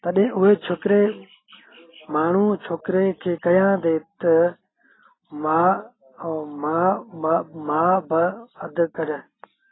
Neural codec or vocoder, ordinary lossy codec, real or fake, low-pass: none; AAC, 16 kbps; real; 7.2 kHz